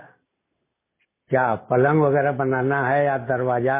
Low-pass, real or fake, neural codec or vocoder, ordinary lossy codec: 3.6 kHz; real; none; MP3, 16 kbps